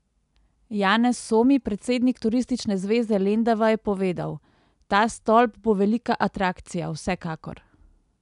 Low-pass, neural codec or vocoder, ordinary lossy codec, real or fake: 10.8 kHz; none; none; real